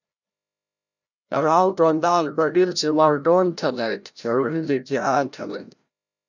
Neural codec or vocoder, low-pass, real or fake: codec, 16 kHz, 0.5 kbps, FreqCodec, larger model; 7.2 kHz; fake